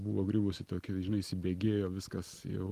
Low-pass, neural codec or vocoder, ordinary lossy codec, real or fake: 14.4 kHz; none; Opus, 24 kbps; real